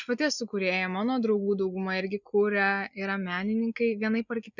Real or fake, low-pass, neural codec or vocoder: real; 7.2 kHz; none